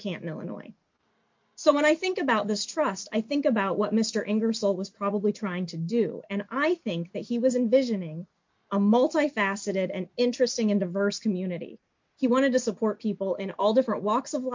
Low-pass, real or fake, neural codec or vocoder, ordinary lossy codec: 7.2 kHz; real; none; MP3, 48 kbps